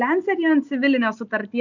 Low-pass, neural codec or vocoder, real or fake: 7.2 kHz; none; real